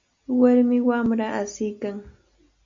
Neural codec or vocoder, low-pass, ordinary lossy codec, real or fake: none; 7.2 kHz; MP3, 48 kbps; real